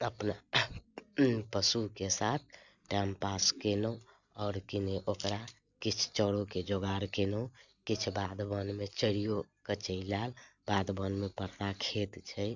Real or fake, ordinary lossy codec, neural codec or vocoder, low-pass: real; none; none; 7.2 kHz